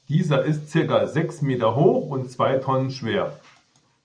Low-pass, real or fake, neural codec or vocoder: 9.9 kHz; real; none